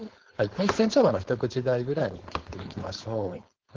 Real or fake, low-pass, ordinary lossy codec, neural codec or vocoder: fake; 7.2 kHz; Opus, 16 kbps; codec, 16 kHz, 4.8 kbps, FACodec